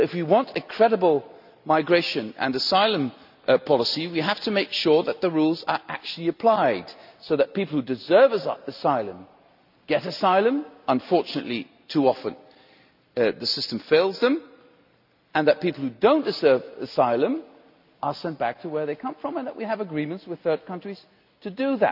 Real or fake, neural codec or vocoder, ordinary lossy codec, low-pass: real; none; none; 5.4 kHz